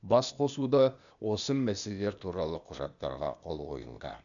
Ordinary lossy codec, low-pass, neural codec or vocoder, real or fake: none; 7.2 kHz; codec, 16 kHz, 0.8 kbps, ZipCodec; fake